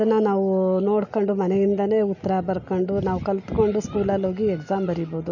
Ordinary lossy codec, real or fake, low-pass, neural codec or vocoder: none; real; 7.2 kHz; none